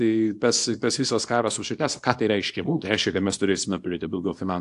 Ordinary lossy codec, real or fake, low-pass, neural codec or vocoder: AAC, 64 kbps; fake; 10.8 kHz; codec, 24 kHz, 0.9 kbps, WavTokenizer, small release